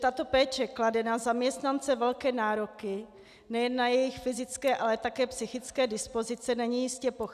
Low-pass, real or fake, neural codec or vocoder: 14.4 kHz; real; none